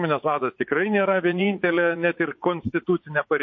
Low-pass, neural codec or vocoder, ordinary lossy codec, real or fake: 7.2 kHz; vocoder, 44.1 kHz, 80 mel bands, Vocos; MP3, 32 kbps; fake